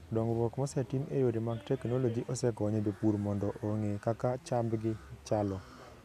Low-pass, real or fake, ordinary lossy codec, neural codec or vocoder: 14.4 kHz; real; none; none